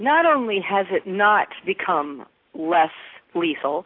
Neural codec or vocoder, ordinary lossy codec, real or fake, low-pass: none; AAC, 32 kbps; real; 5.4 kHz